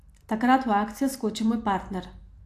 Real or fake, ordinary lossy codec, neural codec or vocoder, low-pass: real; AAC, 64 kbps; none; 14.4 kHz